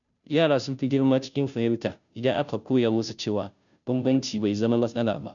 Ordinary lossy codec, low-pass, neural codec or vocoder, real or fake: none; 7.2 kHz; codec, 16 kHz, 0.5 kbps, FunCodec, trained on Chinese and English, 25 frames a second; fake